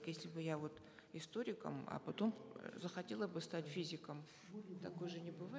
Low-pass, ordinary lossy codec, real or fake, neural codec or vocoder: none; none; real; none